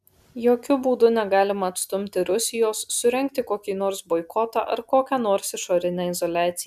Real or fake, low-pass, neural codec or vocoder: real; 14.4 kHz; none